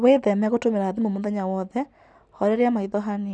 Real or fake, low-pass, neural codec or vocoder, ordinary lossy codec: real; none; none; none